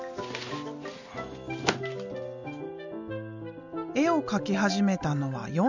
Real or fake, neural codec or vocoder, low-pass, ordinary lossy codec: real; none; 7.2 kHz; none